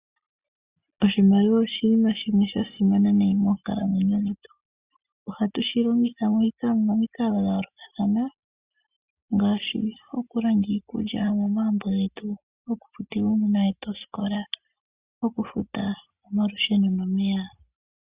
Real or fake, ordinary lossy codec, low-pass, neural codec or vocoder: real; Opus, 64 kbps; 3.6 kHz; none